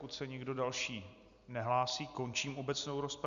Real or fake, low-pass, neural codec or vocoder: real; 7.2 kHz; none